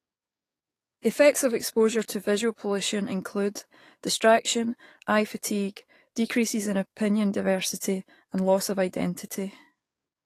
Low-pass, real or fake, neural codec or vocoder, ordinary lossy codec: 14.4 kHz; fake; codec, 44.1 kHz, 7.8 kbps, DAC; AAC, 48 kbps